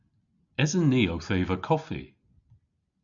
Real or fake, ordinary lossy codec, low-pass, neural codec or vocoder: real; MP3, 96 kbps; 7.2 kHz; none